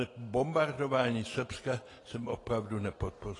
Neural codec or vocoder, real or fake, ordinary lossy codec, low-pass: none; real; AAC, 32 kbps; 10.8 kHz